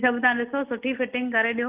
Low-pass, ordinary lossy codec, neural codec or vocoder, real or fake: 3.6 kHz; Opus, 24 kbps; none; real